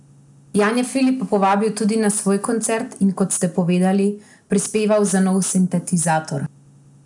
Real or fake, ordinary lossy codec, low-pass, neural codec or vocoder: real; none; 10.8 kHz; none